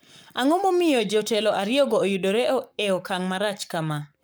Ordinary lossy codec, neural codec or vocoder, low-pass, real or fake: none; codec, 44.1 kHz, 7.8 kbps, Pupu-Codec; none; fake